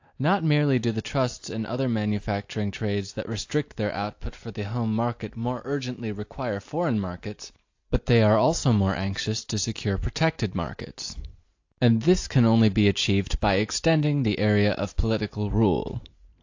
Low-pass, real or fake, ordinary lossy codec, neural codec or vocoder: 7.2 kHz; real; AAC, 48 kbps; none